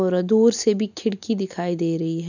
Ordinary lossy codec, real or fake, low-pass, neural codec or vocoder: none; real; 7.2 kHz; none